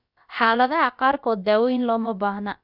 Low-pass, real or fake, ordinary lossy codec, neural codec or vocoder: 5.4 kHz; fake; none; codec, 16 kHz, about 1 kbps, DyCAST, with the encoder's durations